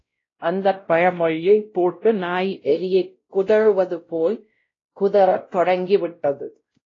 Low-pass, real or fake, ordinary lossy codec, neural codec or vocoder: 7.2 kHz; fake; AAC, 32 kbps; codec, 16 kHz, 0.5 kbps, X-Codec, WavLM features, trained on Multilingual LibriSpeech